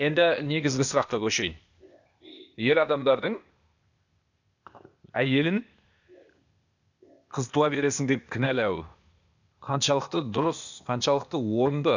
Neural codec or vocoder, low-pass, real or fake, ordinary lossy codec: codec, 16 kHz, 0.8 kbps, ZipCodec; 7.2 kHz; fake; none